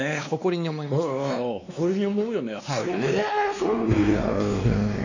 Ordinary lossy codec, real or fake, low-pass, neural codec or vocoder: none; fake; 7.2 kHz; codec, 16 kHz, 2 kbps, X-Codec, WavLM features, trained on Multilingual LibriSpeech